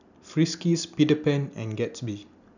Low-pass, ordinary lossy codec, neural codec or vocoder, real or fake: 7.2 kHz; none; none; real